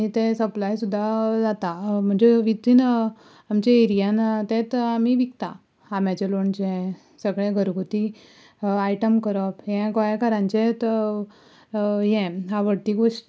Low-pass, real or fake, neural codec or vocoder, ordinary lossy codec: none; real; none; none